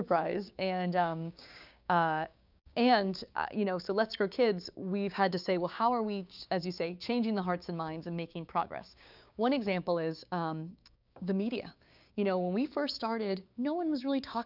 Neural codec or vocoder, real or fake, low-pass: codec, 16 kHz, 6 kbps, DAC; fake; 5.4 kHz